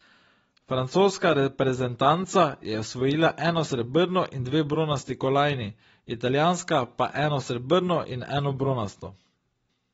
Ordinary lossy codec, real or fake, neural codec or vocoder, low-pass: AAC, 24 kbps; real; none; 19.8 kHz